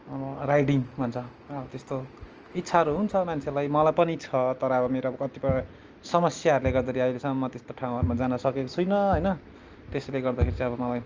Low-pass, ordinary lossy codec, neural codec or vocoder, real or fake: 7.2 kHz; Opus, 24 kbps; none; real